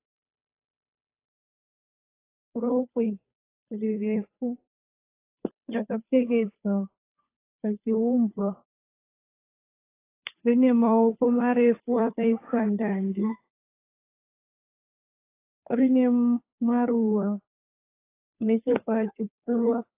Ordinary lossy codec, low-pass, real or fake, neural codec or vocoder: AAC, 24 kbps; 3.6 kHz; fake; codec, 16 kHz, 2 kbps, FunCodec, trained on Chinese and English, 25 frames a second